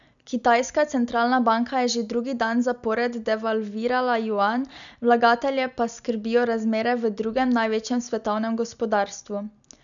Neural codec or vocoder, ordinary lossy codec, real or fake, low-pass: none; none; real; 7.2 kHz